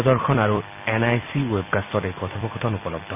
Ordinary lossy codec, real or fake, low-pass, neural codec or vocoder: AAC, 32 kbps; fake; 3.6 kHz; vocoder, 44.1 kHz, 128 mel bands every 256 samples, BigVGAN v2